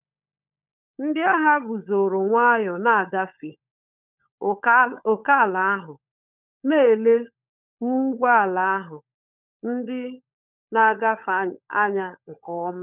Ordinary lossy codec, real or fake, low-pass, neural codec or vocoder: none; fake; 3.6 kHz; codec, 16 kHz, 16 kbps, FunCodec, trained on LibriTTS, 50 frames a second